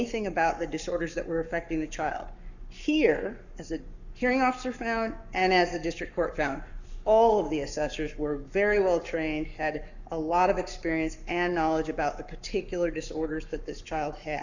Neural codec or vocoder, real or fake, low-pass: codec, 44.1 kHz, 7.8 kbps, Pupu-Codec; fake; 7.2 kHz